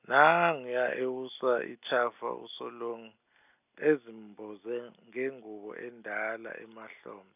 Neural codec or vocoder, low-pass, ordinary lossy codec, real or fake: none; 3.6 kHz; none; real